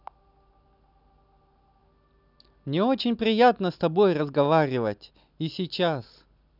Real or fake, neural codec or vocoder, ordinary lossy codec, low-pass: real; none; none; 5.4 kHz